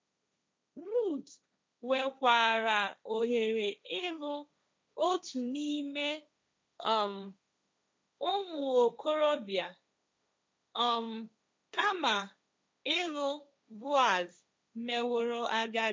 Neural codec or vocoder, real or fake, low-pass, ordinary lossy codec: codec, 16 kHz, 1.1 kbps, Voila-Tokenizer; fake; none; none